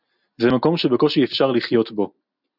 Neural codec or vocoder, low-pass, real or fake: none; 5.4 kHz; real